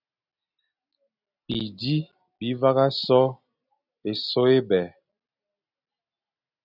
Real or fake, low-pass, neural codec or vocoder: real; 5.4 kHz; none